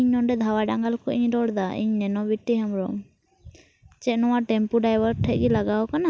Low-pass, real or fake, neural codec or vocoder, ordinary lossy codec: none; real; none; none